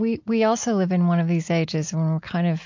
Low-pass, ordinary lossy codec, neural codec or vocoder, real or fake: 7.2 kHz; MP3, 48 kbps; none; real